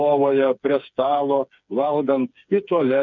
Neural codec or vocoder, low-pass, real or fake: codec, 16 kHz, 4 kbps, FreqCodec, smaller model; 7.2 kHz; fake